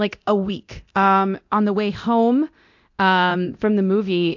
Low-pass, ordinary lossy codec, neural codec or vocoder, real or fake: 7.2 kHz; AAC, 48 kbps; codec, 24 kHz, 0.9 kbps, DualCodec; fake